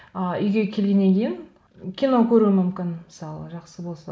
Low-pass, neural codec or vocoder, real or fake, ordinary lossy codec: none; none; real; none